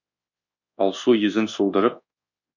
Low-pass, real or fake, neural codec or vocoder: 7.2 kHz; fake; codec, 24 kHz, 1.2 kbps, DualCodec